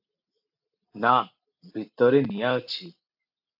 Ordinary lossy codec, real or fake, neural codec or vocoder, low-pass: AAC, 48 kbps; real; none; 5.4 kHz